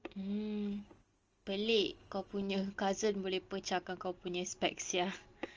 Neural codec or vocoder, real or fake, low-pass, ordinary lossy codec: none; real; 7.2 kHz; Opus, 24 kbps